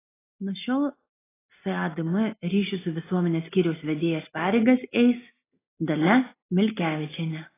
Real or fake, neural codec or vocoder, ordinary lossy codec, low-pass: real; none; AAC, 16 kbps; 3.6 kHz